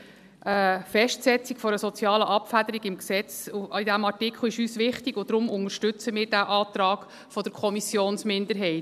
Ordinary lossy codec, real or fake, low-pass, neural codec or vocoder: none; real; 14.4 kHz; none